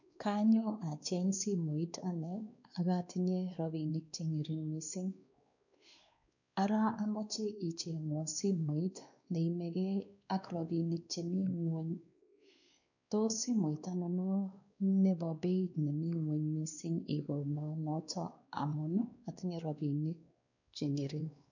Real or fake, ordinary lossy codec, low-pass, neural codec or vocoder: fake; none; 7.2 kHz; codec, 16 kHz, 2 kbps, X-Codec, WavLM features, trained on Multilingual LibriSpeech